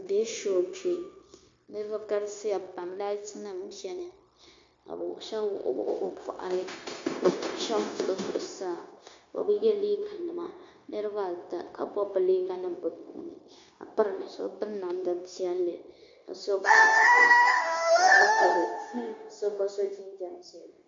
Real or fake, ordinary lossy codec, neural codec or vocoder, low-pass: fake; MP3, 48 kbps; codec, 16 kHz, 0.9 kbps, LongCat-Audio-Codec; 7.2 kHz